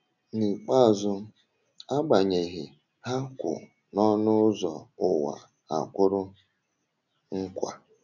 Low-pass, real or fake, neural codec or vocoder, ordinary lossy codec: 7.2 kHz; real; none; none